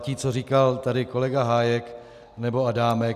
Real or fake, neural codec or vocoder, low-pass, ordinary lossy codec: real; none; 14.4 kHz; AAC, 96 kbps